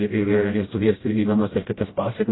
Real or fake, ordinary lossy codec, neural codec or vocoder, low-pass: fake; AAC, 16 kbps; codec, 16 kHz, 0.5 kbps, FreqCodec, smaller model; 7.2 kHz